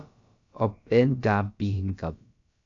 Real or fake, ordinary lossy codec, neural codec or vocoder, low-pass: fake; AAC, 48 kbps; codec, 16 kHz, about 1 kbps, DyCAST, with the encoder's durations; 7.2 kHz